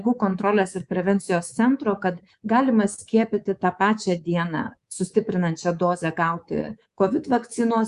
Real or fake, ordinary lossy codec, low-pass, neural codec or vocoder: fake; Opus, 64 kbps; 10.8 kHz; codec, 24 kHz, 3.1 kbps, DualCodec